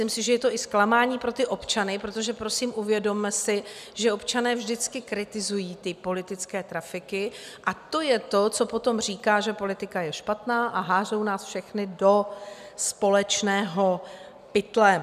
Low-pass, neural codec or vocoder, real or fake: 14.4 kHz; none; real